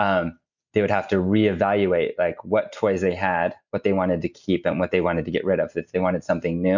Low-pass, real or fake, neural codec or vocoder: 7.2 kHz; real; none